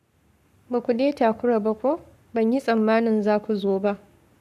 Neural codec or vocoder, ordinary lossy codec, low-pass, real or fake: codec, 44.1 kHz, 7.8 kbps, Pupu-Codec; none; 14.4 kHz; fake